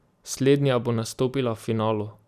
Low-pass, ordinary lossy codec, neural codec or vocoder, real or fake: 14.4 kHz; none; none; real